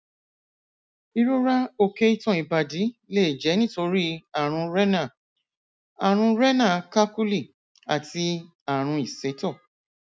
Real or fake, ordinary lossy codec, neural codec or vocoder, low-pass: real; none; none; none